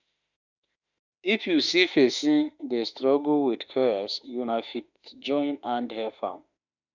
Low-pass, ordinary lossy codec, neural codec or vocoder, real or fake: 7.2 kHz; none; autoencoder, 48 kHz, 32 numbers a frame, DAC-VAE, trained on Japanese speech; fake